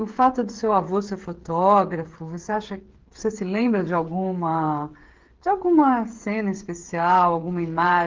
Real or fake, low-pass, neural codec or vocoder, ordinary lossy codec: fake; 7.2 kHz; codec, 16 kHz, 8 kbps, FreqCodec, smaller model; Opus, 16 kbps